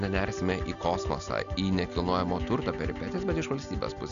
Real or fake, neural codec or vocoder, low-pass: real; none; 7.2 kHz